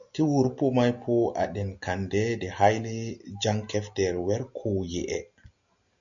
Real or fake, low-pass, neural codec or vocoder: real; 7.2 kHz; none